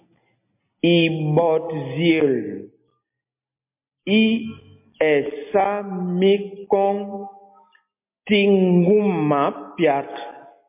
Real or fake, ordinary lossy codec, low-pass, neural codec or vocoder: real; MP3, 24 kbps; 3.6 kHz; none